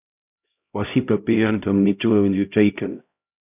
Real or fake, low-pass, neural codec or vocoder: fake; 3.6 kHz; codec, 16 kHz, 0.5 kbps, X-Codec, HuBERT features, trained on LibriSpeech